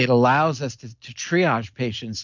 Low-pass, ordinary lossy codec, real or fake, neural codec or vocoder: 7.2 kHz; MP3, 64 kbps; real; none